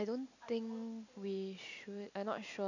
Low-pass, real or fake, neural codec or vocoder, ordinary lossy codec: 7.2 kHz; real; none; none